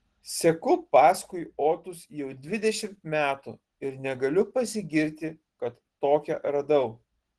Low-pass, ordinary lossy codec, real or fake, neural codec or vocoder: 10.8 kHz; Opus, 16 kbps; real; none